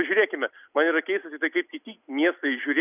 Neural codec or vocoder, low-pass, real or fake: none; 3.6 kHz; real